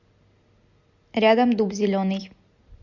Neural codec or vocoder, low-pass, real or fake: none; 7.2 kHz; real